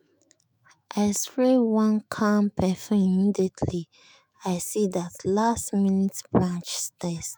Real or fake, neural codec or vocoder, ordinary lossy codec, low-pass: fake; autoencoder, 48 kHz, 128 numbers a frame, DAC-VAE, trained on Japanese speech; none; none